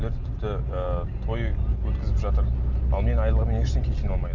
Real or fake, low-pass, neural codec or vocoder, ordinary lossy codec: real; 7.2 kHz; none; none